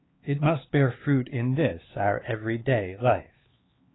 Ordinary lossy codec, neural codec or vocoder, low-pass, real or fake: AAC, 16 kbps; codec, 16 kHz, 4 kbps, X-Codec, HuBERT features, trained on LibriSpeech; 7.2 kHz; fake